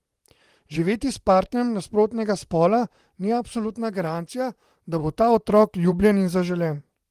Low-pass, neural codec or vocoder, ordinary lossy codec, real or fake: 14.4 kHz; vocoder, 44.1 kHz, 128 mel bands, Pupu-Vocoder; Opus, 24 kbps; fake